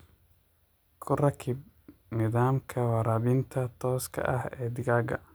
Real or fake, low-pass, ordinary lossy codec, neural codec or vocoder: real; none; none; none